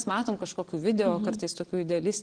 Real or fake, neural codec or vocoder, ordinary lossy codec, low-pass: real; none; Opus, 16 kbps; 9.9 kHz